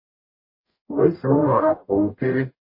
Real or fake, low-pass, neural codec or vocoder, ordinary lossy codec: fake; 5.4 kHz; codec, 44.1 kHz, 0.9 kbps, DAC; MP3, 24 kbps